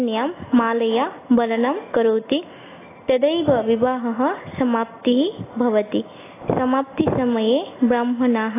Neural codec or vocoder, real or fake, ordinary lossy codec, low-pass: none; real; AAC, 16 kbps; 3.6 kHz